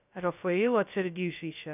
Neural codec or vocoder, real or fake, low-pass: codec, 16 kHz, 0.2 kbps, FocalCodec; fake; 3.6 kHz